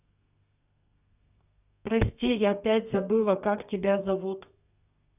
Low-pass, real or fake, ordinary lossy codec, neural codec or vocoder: 3.6 kHz; fake; none; codec, 32 kHz, 1.9 kbps, SNAC